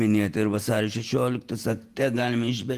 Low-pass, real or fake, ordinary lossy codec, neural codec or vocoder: 14.4 kHz; fake; Opus, 24 kbps; vocoder, 48 kHz, 128 mel bands, Vocos